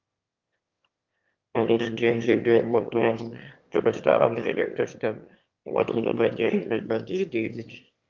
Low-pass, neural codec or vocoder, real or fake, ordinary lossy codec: 7.2 kHz; autoencoder, 22.05 kHz, a latent of 192 numbers a frame, VITS, trained on one speaker; fake; Opus, 24 kbps